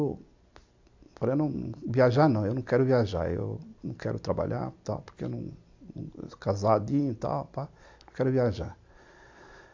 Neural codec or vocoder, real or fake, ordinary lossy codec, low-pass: none; real; AAC, 48 kbps; 7.2 kHz